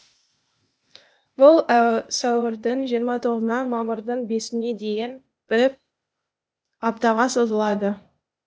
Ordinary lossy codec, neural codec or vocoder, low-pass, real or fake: none; codec, 16 kHz, 0.8 kbps, ZipCodec; none; fake